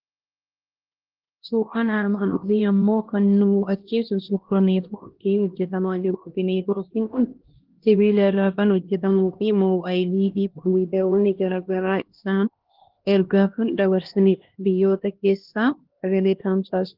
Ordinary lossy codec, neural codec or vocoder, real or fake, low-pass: Opus, 16 kbps; codec, 16 kHz, 1 kbps, X-Codec, HuBERT features, trained on LibriSpeech; fake; 5.4 kHz